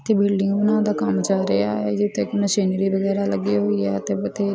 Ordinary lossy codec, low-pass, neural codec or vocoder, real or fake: none; none; none; real